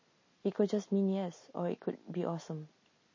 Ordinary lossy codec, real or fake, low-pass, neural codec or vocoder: MP3, 32 kbps; real; 7.2 kHz; none